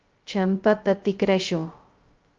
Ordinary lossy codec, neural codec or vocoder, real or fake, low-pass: Opus, 24 kbps; codec, 16 kHz, 0.2 kbps, FocalCodec; fake; 7.2 kHz